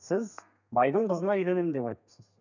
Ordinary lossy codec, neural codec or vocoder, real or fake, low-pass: none; codec, 32 kHz, 1.9 kbps, SNAC; fake; 7.2 kHz